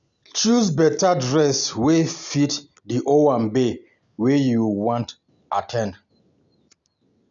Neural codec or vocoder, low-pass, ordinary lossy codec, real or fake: none; 7.2 kHz; none; real